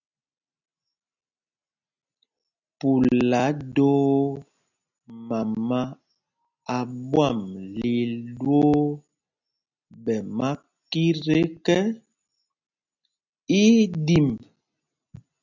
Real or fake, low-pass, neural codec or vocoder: real; 7.2 kHz; none